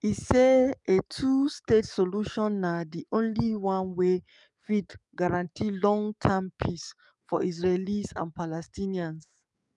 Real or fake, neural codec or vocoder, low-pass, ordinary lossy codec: fake; codec, 44.1 kHz, 7.8 kbps, DAC; 10.8 kHz; none